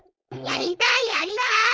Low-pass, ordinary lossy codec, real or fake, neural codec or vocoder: none; none; fake; codec, 16 kHz, 4.8 kbps, FACodec